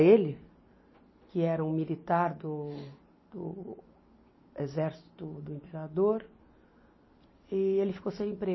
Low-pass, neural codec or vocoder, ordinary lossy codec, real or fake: 7.2 kHz; none; MP3, 24 kbps; real